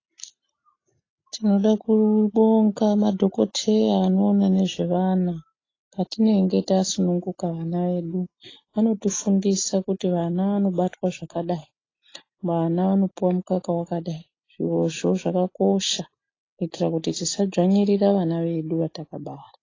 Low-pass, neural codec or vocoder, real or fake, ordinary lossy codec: 7.2 kHz; none; real; AAC, 32 kbps